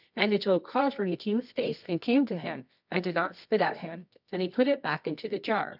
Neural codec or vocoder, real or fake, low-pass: codec, 24 kHz, 0.9 kbps, WavTokenizer, medium music audio release; fake; 5.4 kHz